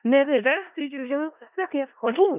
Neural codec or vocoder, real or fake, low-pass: codec, 16 kHz in and 24 kHz out, 0.4 kbps, LongCat-Audio-Codec, four codebook decoder; fake; 3.6 kHz